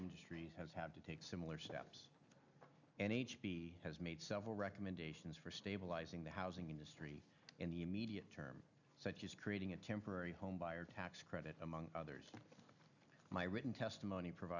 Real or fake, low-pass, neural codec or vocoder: real; 7.2 kHz; none